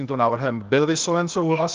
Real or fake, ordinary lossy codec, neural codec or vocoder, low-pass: fake; Opus, 24 kbps; codec, 16 kHz, 0.8 kbps, ZipCodec; 7.2 kHz